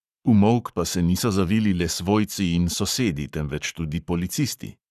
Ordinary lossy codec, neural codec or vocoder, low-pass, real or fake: none; codec, 44.1 kHz, 7.8 kbps, Pupu-Codec; 14.4 kHz; fake